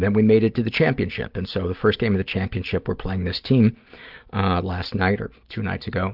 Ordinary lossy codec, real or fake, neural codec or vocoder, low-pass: Opus, 32 kbps; real; none; 5.4 kHz